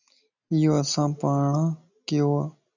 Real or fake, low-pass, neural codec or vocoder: real; 7.2 kHz; none